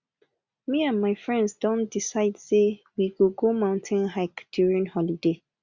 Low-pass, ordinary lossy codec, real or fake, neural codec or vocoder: 7.2 kHz; Opus, 64 kbps; real; none